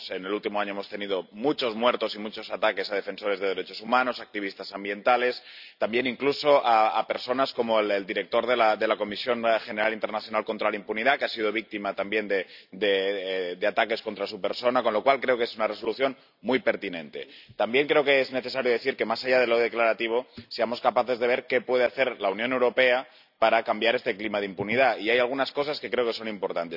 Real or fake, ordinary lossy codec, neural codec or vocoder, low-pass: real; none; none; 5.4 kHz